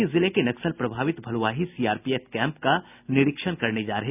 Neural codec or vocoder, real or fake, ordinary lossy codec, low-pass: none; real; none; 3.6 kHz